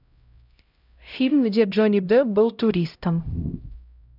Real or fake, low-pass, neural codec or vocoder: fake; 5.4 kHz; codec, 16 kHz, 0.5 kbps, X-Codec, HuBERT features, trained on LibriSpeech